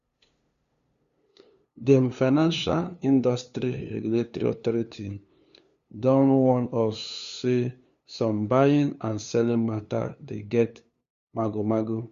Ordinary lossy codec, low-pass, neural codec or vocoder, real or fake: Opus, 64 kbps; 7.2 kHz; codec, 16 kHz, 2 kbps, FunCodec, trained on LibriTTS, 25 frames a second; fake